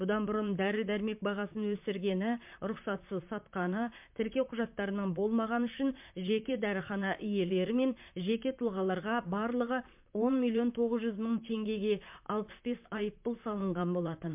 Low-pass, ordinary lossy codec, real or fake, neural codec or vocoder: 3.6 kHz; MP3, 32 kbps; fake; vocoder, 44.1 kHz, 128 mel bands, Pupu-Vocoder